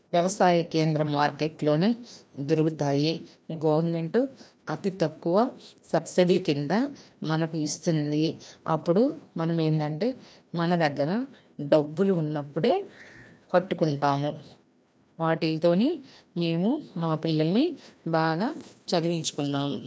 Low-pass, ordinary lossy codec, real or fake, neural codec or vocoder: none; none; fake; codec, 16 kHz, 1 kbps, FreqCodec, larger model